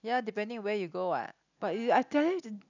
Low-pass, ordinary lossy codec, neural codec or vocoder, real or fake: 7.2 kHz; none; none; real